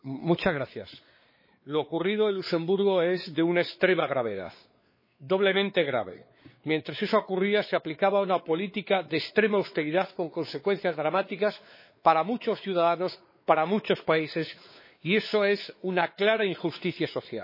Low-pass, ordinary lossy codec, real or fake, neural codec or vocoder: 5.4 kHz; MP3, 24 kbps; fake; codec, 16 kHz, 4 kbps, X-Codec, WavLM features, trained on Multilingual LibriSpeech